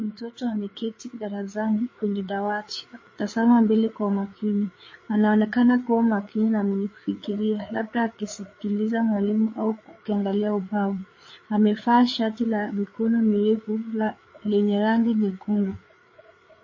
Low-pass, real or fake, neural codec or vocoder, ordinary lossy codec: 7.2 kHz; fake; codec, 16 kHz, 4 kbps, FreqCodec, larger model; MP3, 32 kbps